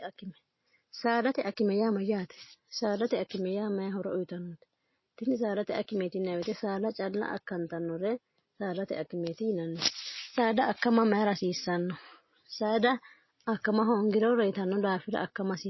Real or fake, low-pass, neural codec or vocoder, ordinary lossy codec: real; 7.2 kHz; none; MP3, 24 kbps